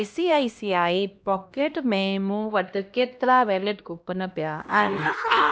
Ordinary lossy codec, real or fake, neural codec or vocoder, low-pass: none; fake; codec, 16 kHz, 1 kbps, X-Codec, HuBERT features, trained on LibriSpeech; none